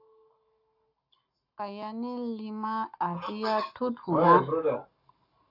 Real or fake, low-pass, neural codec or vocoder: fake; 5.4 kHz; codec, 44.1 kHz, 7.8 kbps, Pupu-Codec